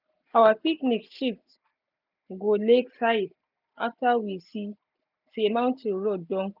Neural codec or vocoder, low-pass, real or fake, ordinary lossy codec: none; 5.4 kHz; real; none